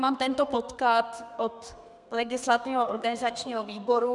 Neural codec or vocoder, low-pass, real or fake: codec, 32 kHz, 1.9 kbps, SNAC; 10.8 kHz; fake